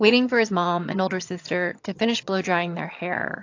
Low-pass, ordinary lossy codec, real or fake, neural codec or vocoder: 7.2 kHz; AAC, 48 kbps; fake; vocoder, 22.05 kHz, 80 mel bands, HiFi-GAN